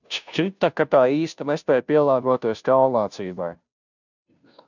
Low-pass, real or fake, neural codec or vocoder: 7.2 kHz; fake; codec, 16 kHz, 0.5 kbps, FunCodec, trained on Chinese and English, 25 frames a second